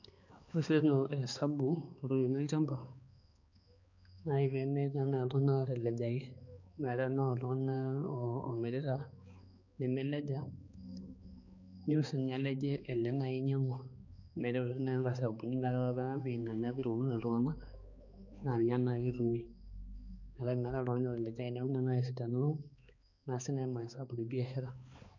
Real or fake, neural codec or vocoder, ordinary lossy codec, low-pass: fake; codec, 16 kHz, 2 kbps, X-Codec, HuBERT features, trained on balanced general audio; none; 7.2 kHz